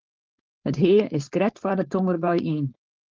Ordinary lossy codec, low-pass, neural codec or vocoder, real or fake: Opus, 24 kbps; 7.2 kHz; codec, 16 kHz, 4.8 kbps, FACodec; fake